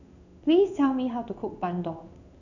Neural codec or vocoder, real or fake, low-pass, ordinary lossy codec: codec, 16 kHz in and 24 kHz out, 1 kbps, XY-Tokenizer; fake; 7.2 kHz; none